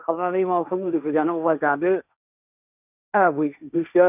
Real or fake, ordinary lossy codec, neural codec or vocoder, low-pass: fake; none; codec, 16 kHz, 1.1 kbps, Voila-Tokenizer; 3.6 kHz